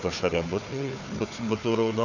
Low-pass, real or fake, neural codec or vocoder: 7.2 kHz; fake; codec, 16 kHz, 4 kbps, FunCodec, trained on Chinese and English, 50 frames a second